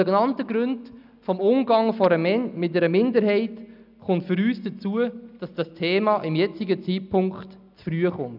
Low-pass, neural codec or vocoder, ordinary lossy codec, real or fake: 5.4 kHz; none; none; real